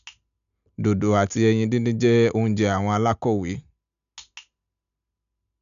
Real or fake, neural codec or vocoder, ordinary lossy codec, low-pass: real; none; none; 7.2 kHz